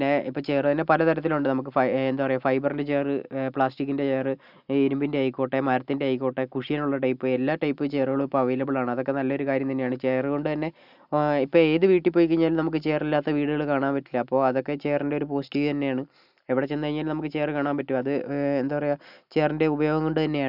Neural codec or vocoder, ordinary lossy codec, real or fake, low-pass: none; none; real; 5.4 kHz